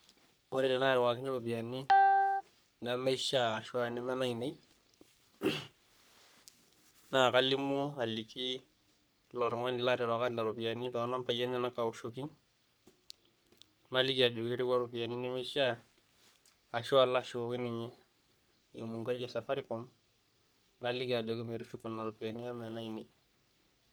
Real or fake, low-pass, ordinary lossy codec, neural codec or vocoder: fake; none; none; codec, 44.1 kHz, 3.4 kbps, Pupu-Codec